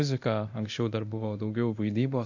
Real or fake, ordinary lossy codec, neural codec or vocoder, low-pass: fake; AAC, 48 kbps; codec, 24 kHz, 0.9 kbps, DualCodec; 7.2 kHz